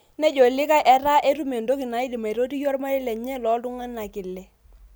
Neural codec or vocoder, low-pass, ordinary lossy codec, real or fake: none; none; none; real